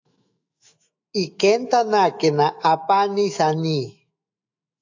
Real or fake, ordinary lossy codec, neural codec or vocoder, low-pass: fake; AAC, 48 kbps; autoencoder, 48 kHz, 128 numbers a frame, DAC-VAE, trained on Japanese speech; 7.2 kHz